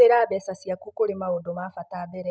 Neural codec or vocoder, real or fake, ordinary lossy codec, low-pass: none; real; none; none